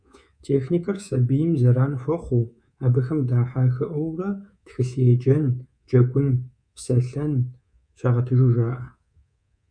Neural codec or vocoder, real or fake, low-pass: codec, 24 kHz, 3.1 kbps, DualCodec; fake; 9.9 kHz